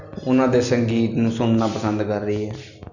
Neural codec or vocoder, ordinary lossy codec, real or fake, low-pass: none; none; real; 7.2 kHz